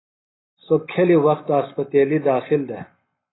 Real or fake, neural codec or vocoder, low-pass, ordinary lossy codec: real; none; 7.2 kHz; AAC, 16 kbps